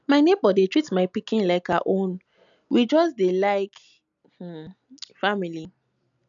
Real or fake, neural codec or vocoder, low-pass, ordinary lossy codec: real; none; 7.2 kHz; none